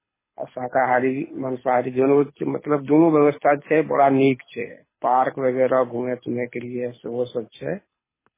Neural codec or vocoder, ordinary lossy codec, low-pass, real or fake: codec, 24 kHz, 6 kbps, HILCodec; MP3, 16 kbps; 3.6 kHz; fake